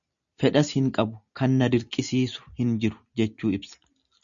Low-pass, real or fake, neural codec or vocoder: 7.2 kHz; real; none